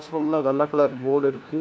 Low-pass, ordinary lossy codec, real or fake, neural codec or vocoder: none; none; fake; codec, 16 kHz, 1 kbps, FunCodec, trained on LibriTTS, 50 frames a second